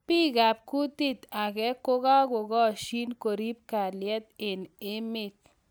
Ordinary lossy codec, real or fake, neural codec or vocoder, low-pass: none; real; none; none